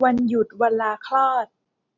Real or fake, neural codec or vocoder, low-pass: real; none; 7.2 kHz